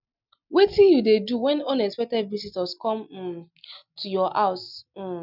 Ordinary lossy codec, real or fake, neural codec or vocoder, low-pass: none; real; none; 5.4 kHz